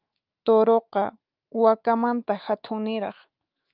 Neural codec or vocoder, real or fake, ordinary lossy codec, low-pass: autoencoder, 48 kHz, 128 numbers a frame, DAC-VAE, trained on Japanese speech; fake; Opus, 24 kbps; 5.4 kHz